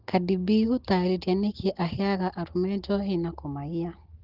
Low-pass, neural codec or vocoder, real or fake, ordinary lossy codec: 5.4 kHz; none; real; Opus, 16 kbps